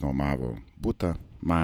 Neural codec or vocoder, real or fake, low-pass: vocoder, 48 kHz, 128 mel bands, Vocos; fake; 19.8 kHz